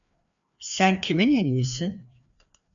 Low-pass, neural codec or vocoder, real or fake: 7.2 kHz; codec, 16 kHz, 2 kbps, FreqCodec, larger model; fake